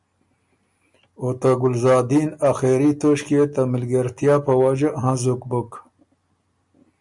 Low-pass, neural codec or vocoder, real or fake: 10.8 kHz; none; real